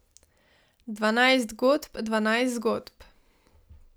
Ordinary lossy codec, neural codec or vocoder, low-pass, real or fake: none; none; none; real